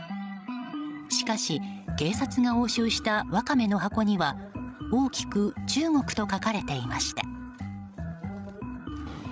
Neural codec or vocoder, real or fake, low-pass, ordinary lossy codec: codec, 16 kHz, 16 kbps, FreqCodec, larger model; fake; none; none